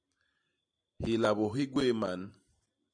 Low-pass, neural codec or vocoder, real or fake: 9.9 kHz; vocoder, 44.1 kHz, 128 mel bands every 512 samples, BigVGAN v2; fake